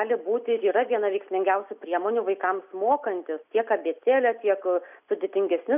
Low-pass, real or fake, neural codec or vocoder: 3.6 kHz; real; none